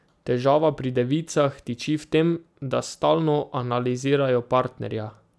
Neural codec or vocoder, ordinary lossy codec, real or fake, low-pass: none; none; real; none